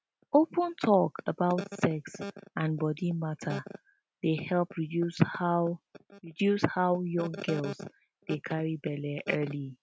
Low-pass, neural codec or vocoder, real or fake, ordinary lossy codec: none; none; real; none